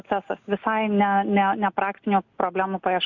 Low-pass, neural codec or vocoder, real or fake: 7.2 kHz; none; real